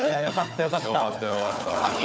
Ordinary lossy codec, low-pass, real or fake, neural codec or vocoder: none; none; fake; codec, 16 kHz, 4 kbps, FunCodec, trained on Chinese and English, 50 frames a second